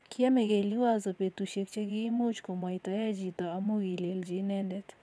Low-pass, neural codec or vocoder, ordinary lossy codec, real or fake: none; vocoder, 22.05 kHz, 80 mel bands, WaveNeXt; none; fake